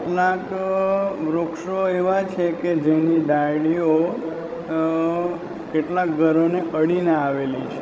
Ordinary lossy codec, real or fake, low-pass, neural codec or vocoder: none; fake; none; codec, 16 kHz, 16 kbps, FreqCodec, larger model